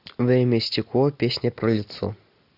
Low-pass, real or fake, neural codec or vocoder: 5.4 kHz; real; none